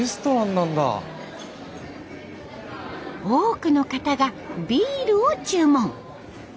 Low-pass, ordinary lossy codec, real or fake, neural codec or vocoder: none; none; real; none